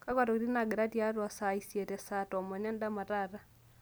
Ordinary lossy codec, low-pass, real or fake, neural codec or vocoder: none; none; real; none